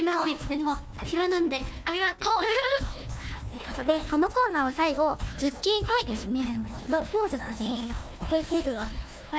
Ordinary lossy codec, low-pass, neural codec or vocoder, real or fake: none; none; codec, 16 kHz, 1 kbps, FunCodec, trained on Chinese and English, 50 frames a second; fake